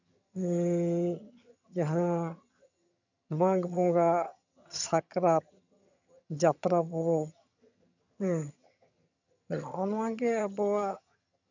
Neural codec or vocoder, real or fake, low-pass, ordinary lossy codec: vocoder, 22.05 kHz, 80 mel bands, HiFi-GAN; fake; 7.2 kHz; none